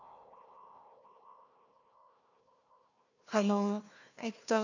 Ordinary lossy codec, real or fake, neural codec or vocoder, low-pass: none; fake; codec, 16 kHz, 1 kbps, FunCodec, trained on Chinese and English, 50 frames a second; 7.2 kHz